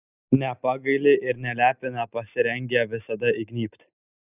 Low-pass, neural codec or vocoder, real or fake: 3.6 kHz; none; real